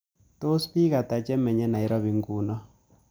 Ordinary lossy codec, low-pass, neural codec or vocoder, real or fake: none; none; none; real